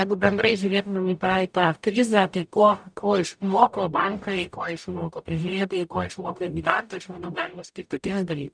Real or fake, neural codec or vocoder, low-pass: fake; codec, 44.1 kHz, 0.9 kbps, DAC; 9.9 kHz